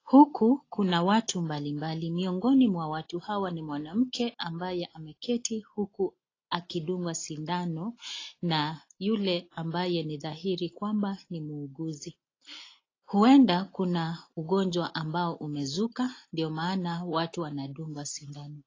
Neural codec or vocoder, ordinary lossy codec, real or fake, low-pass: none; AAC, 32 kbps; real; 7.2 kHz